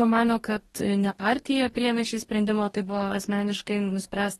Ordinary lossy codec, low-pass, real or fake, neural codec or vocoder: AAC, 32 kbps; 19.8 kHz; fake; codec, 44.1 kHz, 2.6 kbps, DAC